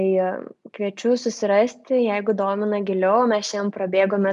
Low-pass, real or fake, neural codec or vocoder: 10.8 kHz; real; none